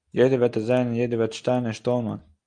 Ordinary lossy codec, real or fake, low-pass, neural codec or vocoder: Opus, 32 kbps; real; 9.9 kHz; none